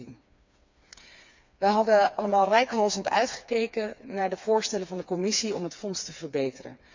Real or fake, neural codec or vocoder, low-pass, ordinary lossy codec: fake; codec, 16 kHz, 4 kbps, FreqCodec, smaller model; 7.2 kHz; MP3, 64 kbps